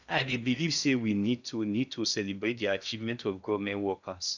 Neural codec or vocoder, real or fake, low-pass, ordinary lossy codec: codec, 16 kHz in and 24 kHz out, 0.8 kbps, FocalCodec, streaming, 65536 codes; fake; 7.2 kHz; none